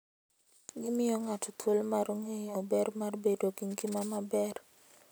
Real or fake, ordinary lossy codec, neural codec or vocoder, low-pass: real; none; none; none